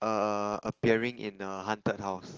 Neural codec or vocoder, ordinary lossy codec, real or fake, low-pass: none; Opus, 16 kbps; real; 7.2 kHz